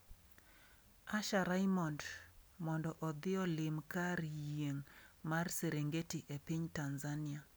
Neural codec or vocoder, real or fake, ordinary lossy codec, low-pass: none; real; none; none